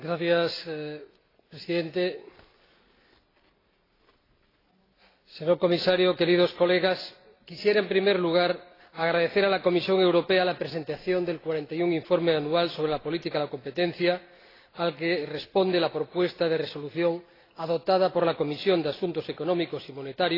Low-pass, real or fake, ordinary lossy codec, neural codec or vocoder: 5.4 kHz; real; AAC, 24 kbps; none